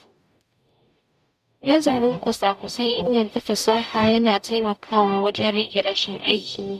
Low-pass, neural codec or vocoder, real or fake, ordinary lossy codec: 14.4 kHz; codec, 44.1 kHz, 0.9 kbps, DAC; fake; none